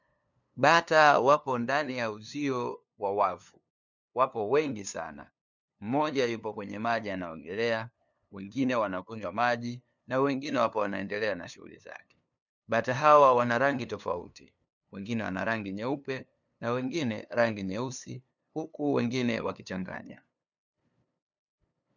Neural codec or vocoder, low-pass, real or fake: codec, 16 kHz, 2 kbps, FunCodec, trained on LibriTTS, 25 frames a second; 7.2 kHz; fake